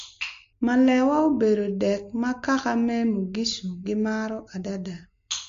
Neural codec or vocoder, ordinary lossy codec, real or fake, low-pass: none; MP3, 48 kbps; real; 7.2 kHz